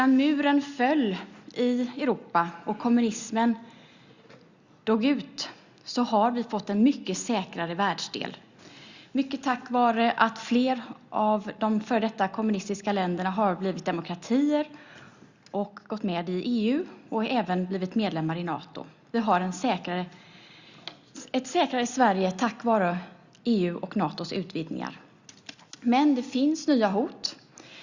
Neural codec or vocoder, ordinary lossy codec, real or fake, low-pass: none; Opus, 64 kbps; real; 7.2 kHz